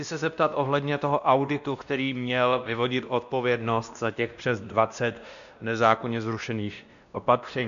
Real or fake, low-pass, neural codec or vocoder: fake; 7.2 kHz; codec, 16 kHz, 1 kbps, X-Codec, WavLM features, trained on Multilingual LibriSpeech